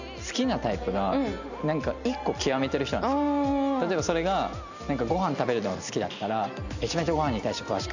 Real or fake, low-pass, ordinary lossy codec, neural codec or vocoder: real; 7.2 kHz; none; none